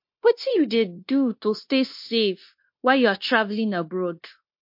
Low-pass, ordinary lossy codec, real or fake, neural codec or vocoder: 5.4 kHz; MP3, 32 kbps; fake; codec, 16 kHz, 0.9 kbps, LongCat-Audio-Codec